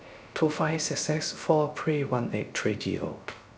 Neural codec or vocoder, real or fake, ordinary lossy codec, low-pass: codec, 16 kHz, 0.3 kbps, FocalCodec; fake; none; none